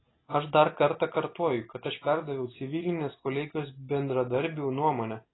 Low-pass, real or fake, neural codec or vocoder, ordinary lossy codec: 7.2 kHz; real; none; AAC, 16 kbps